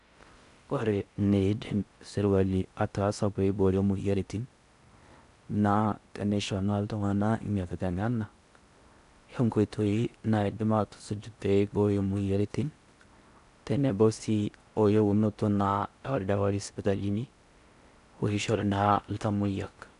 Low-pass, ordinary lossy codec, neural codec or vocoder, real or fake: 10.8 kHz; none; codec, 16 kHz in and 24 kHz out, 0.6 kbps, FocalCodec, streaming, 4096 codes; fake